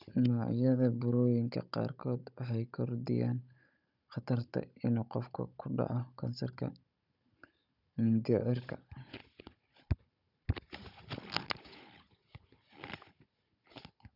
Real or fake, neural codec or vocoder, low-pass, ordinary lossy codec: fake; codec, 16 kHz, 16 kbps, FunCodec, trained on Chinese and English, 50 frames a second; 5.4 kHz; none